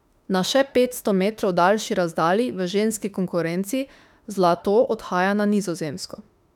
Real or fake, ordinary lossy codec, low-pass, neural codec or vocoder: fake; none; 19.8 kHz; autoencoder, 48 kHz, 32 numbers a frame, DAC-VAE, trained on Japanese speech